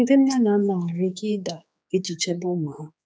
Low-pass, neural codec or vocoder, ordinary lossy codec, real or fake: none; codec, 16 kHz, 4 kbps, X-Codec, HuBERT features, trained on general audio; none; fake